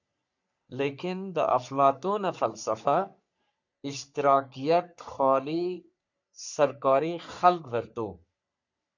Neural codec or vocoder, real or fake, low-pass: codec, 44.1 kHz, 3.4 kbps, Pupu-Codec; fake; 7.2 kHz